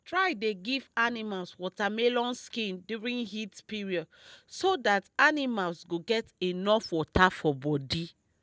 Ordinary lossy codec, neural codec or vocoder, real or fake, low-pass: none; none; real; none